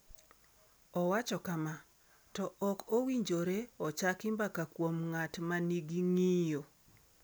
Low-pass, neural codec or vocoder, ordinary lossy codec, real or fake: none; none; none; real